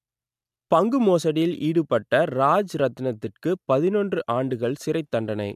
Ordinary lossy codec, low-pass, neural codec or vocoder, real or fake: MP3, 96 kbps; 14.4 kHz; none; real